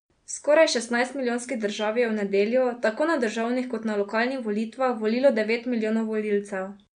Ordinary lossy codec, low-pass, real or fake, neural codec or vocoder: MP3, 64 kbps; 9.9 kHz; real; none